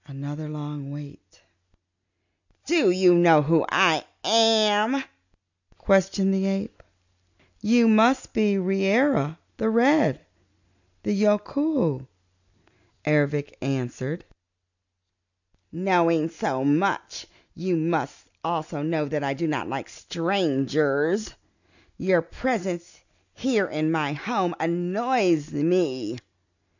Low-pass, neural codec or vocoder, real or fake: 7.2 kHz; none; real